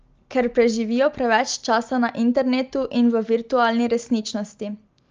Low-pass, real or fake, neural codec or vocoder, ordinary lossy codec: 7.2 kHz; real; none; Opus, 24 kbps